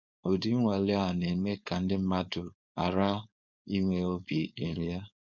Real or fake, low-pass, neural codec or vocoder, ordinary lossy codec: fake; 7.2 kHz; codec, 16 kHz, 4.8 kbps, FACodec; none